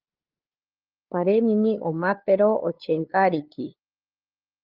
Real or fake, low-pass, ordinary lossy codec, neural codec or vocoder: fake; 5.4 kHz; Opus, 32 kbps; codec, 16 kHz, 2 kbps, FunCodec, trained on LibriTTS, 25 frames a second